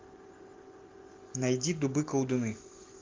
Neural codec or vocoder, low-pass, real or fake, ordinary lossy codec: none; 7.2 kHz; real; Opus, 24 kbps